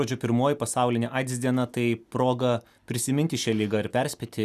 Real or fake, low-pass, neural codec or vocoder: real; 14.4 kHz; none